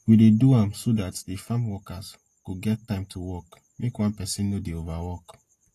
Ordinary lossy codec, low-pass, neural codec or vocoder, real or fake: AAC, 48 kbps; 14.4 kHz; none; real